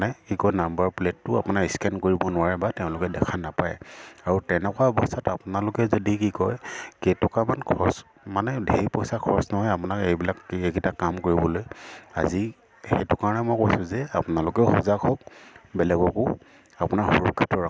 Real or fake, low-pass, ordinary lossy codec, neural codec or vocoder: real; none; none; none